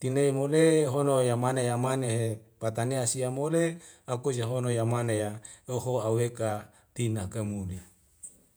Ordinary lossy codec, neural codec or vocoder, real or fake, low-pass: none; vocoder, 48 kHz, 128 mel bands, Vocos; fake; none